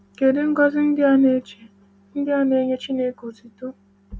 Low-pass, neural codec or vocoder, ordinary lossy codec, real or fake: none; none; none; real